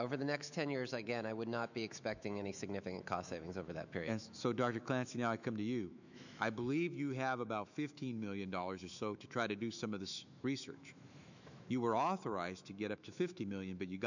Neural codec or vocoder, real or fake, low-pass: autoencoder, 48 kHz, 128 numbers a frame, DAC-VAE, trained on Japanese speech; fake; 7.2 kHz